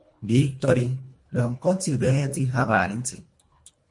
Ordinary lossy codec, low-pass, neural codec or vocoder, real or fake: MP3, 48 kbps; 10.8 kHz; codec, 24 kHz, 1.5 kbps, HILCodec; fake